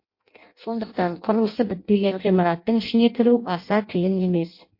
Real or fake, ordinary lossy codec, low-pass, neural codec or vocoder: fake; MP3, 32 kbps; 5.4 kHz; codec, 16 kHz in and 24 kHz out, 0.6 kbps, FireRedTTS-2 codec